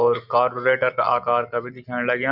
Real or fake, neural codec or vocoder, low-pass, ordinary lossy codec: real; none; 5.4 kHz; none